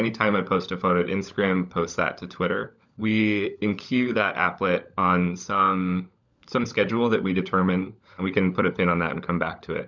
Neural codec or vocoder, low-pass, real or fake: codec, 16 kHz, 16 kbps, FunCodec, trained on LibriTTS, 50 frames a second; 7.2 kHz; fake